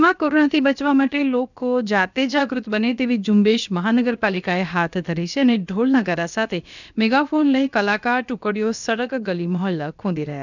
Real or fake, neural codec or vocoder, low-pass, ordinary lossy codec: fake; codec, 16 kHz, about 1 kbps, DyCAST, with the encoder's durations; 7.2 kHz; none